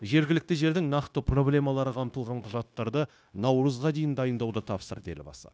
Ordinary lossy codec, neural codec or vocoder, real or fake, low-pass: none; codec, 16 kHz, 0.9 kbps, LongCat-Audio-Codec; fake; none